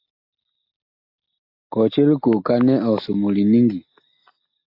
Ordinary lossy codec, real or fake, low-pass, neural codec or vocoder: AAC, 48 kbps; real; 5.4 kHz; none